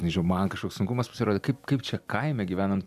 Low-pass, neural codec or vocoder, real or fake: 14.4 kHz; none; real